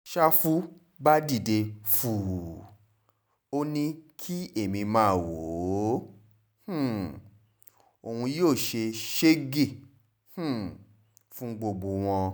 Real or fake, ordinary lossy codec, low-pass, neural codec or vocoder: real; none; none; none